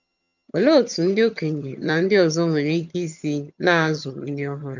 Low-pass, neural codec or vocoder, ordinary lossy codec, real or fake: 7.2 kHz; vocoder, 22.05 kHz, 80 mel bands, HiFi-GAN; none; fake